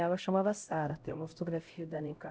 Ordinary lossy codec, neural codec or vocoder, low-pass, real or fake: none; codec, 16 kHz, 0.5 kbps, X-Codec, HuBERT features, trained on LibriSpeech; none; fake